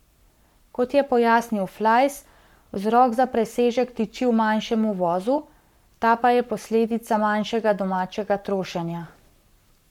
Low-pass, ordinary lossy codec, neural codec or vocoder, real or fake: 19.8 kHz; MP3, 96 kbps; codec, 44.1 kHz, 7.8 kbps, Pupu-Codec; fake